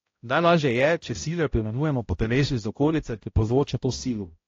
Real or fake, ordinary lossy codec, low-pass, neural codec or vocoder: fake; AAC, 32 kbps; 7.2 kHz; codec, 16 kHz, 0.5 kbps, X-Codec, HuBERT features, trained on balanced general audio